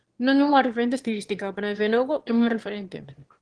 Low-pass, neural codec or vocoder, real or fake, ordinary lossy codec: 9.9 kHz; autoencoder, 22.05 kHz, a latent of 192 numbers a frame, VITS, trained on one speaker; fake; Opus, 16 kbps